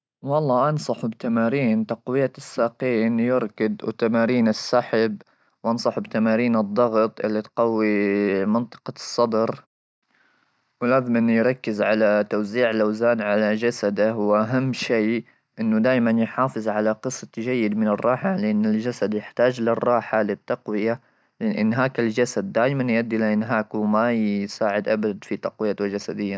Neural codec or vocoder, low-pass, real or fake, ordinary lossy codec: none; none; real; none